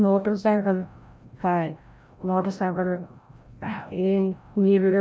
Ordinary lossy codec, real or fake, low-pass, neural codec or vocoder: none; fake; none; codec, 16 kHz, 0.5 kbps, FreqCodec, larger model